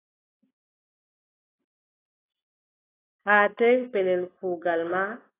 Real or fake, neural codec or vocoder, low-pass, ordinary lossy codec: real; none; 3.6 kHz; AAC, 16 kbps